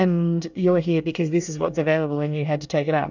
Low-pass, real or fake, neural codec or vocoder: 7.2 kHz; fake; codec, 24 kHz, 1 kbps, SNAC